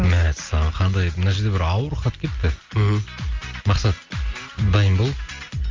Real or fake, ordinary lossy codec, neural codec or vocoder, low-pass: real; Opus, 32 kbps; none; 7.2 kHz